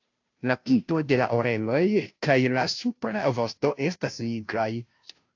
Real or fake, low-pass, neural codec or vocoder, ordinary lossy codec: fake; 7.2 kHz; codec, 16 kHz, 0.5 kbps, FunCodec, trained on Chinese and English, 25 frames a second; AAC, 48 kbps